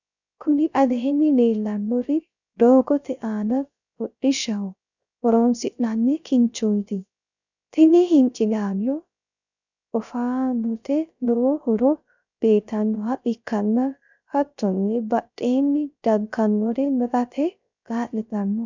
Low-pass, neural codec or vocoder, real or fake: 7.2 kHz; codec, 16 kHz, 0.3 kbps, FocalCodec; fake